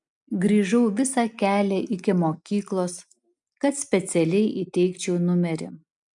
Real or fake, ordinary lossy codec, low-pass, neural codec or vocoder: real; MP3, 96 kbps; 10.8 kHz; none